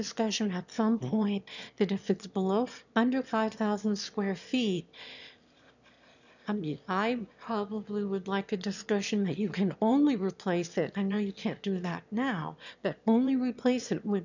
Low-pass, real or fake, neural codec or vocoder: 7.2 kHz; fake; autoencoder, 22.05 kHz, a latent of 192 numbers a frame, VITS, trained on one speaker